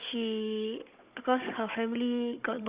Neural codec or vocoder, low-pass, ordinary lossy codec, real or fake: codec, 16 kHz, 16 kbps, FunCodec, trained on Chinese and English, 50 frames a second; 3.6 kHz; Opus, 64 kbps; fake